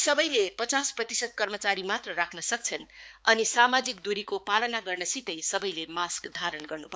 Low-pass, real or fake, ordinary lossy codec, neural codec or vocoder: none; fake; none; codec, 16 kHz, 4 kbps, X-Codec, HuBERT features, trained on balanced general audio